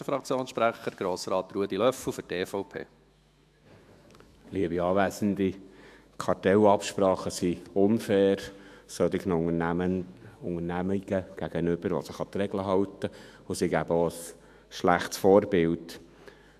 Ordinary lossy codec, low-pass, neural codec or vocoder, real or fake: AAC, 96 kbps; 14.4 kHz; autoencoder, 48 kHz, 128 numbers a frame, DAC-VAE, trained on Japanese speech; fake